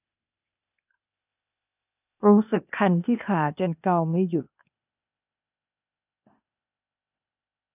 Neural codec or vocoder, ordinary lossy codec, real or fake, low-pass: codec, 16 kHz, 0.8 kbps, ZipCodec; none; fake; 3.6 kHz